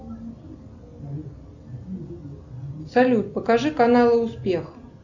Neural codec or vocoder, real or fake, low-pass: none; real; 7.2 kHz